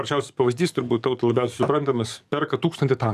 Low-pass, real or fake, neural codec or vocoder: 14.4 kHz; fake; codec, 44.1 kHz, 7.8 kbps, DAC